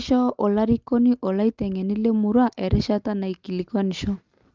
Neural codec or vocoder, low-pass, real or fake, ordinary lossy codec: none; 7.2 kHz; real; Opus, 32 kbps